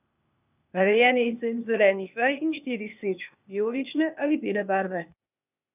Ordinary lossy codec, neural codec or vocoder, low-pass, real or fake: none; codec, 16 kHz, 0.8 kbps, ZipCodec; 3.6 kHz; fake